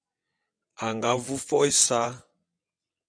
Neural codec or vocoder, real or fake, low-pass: vocoder, 22.05 kHz, 80 mel bands, WaveNeXt; fake; 9.9 kHz